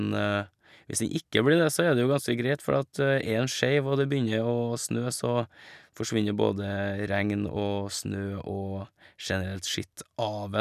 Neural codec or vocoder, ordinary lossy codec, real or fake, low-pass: none; none; real; 14.4 kHz